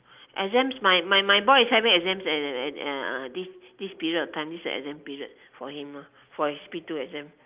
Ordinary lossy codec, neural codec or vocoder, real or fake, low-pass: Opus, 32 kbps; none; real; 3.6 kHz